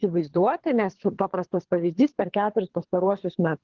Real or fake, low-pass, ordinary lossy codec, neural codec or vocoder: fake; 7.2 kHz; Opus, 16 kbps; codec, 24 kHz, 3 kbps, HILCodec